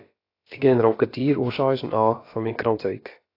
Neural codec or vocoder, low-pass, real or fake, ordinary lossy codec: codec, 16 kHz, about 1 kbps, DyCAST, with the encoder's durations; 5.4 kHz; fake; AAC, 32 kbps